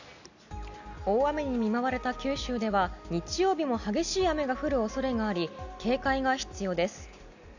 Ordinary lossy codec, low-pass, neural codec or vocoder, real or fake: none; 7.2 kHz; none; real